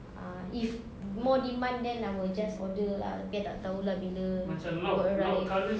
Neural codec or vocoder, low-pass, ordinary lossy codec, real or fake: none; none; none; real